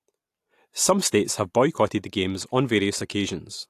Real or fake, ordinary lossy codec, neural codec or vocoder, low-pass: real; AAC, 64 kbps; none; 14.4 kHz